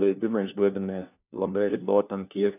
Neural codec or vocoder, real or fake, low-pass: codec, 16 kHz, 1 kbps, FunCodec, trained on LibriTTS, 50 frames a second; fake; 3.6 kHz